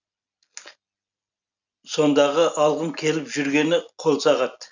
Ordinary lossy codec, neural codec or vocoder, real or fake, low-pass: none; none; real; 7.2 kHz